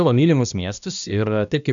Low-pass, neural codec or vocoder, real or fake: 7.2 kHz; codec, 16 kHz, 1 kbps, X-Codec, HuBERT features, trained on balanced general audio; fake